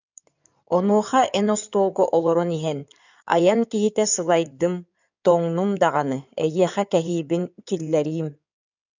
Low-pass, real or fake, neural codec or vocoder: 7.2 kHz; fake; codec, 16 kHz in and 24 kHz out, 2.2 kbps, FireRedTTS-2 codec